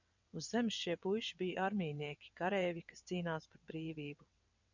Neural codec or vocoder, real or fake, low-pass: vocoder, 22.05 kHz, 80 mel bands, WaveNeXt; fake; 7.2 kHz